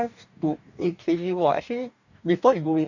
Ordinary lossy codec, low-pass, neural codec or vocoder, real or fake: none; 7.2 kHz; codec, 44.1 kHz, 2.6 kbps, DAC; fake